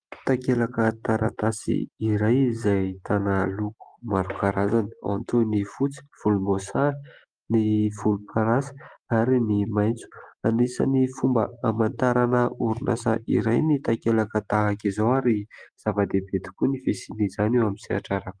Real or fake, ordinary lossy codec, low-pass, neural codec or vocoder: real; Opus, 32 kbps; 9.9 kHz; none